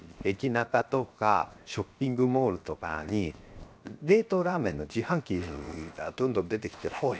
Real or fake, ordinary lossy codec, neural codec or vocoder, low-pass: fake; none; codec, 16 kHz, 0.7 kbps, FocalCodec; none